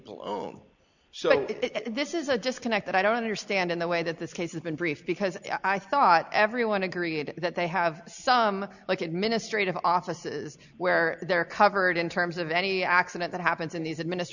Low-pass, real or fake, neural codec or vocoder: 7.2 kHz; real; none